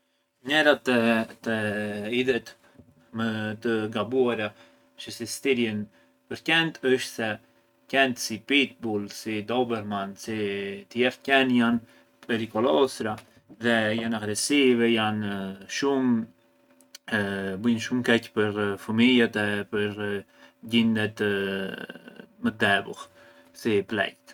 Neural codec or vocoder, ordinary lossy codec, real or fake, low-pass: none; none; real; 19.8 kHz